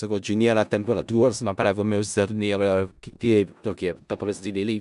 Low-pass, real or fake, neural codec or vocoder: 10.8 kHz; fake; codec, 16 kHz in and 24 kHz out, 0.4 kbps, LongCat-Audio-Codec, four codebook decoder